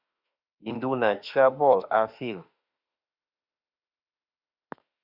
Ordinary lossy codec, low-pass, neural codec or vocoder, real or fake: Opus, 64 kbps; 5.4 kHz; autoencoder, 48 kHz, 32 numbers a frame, DAC-VAE, trained on Japanese speech; fake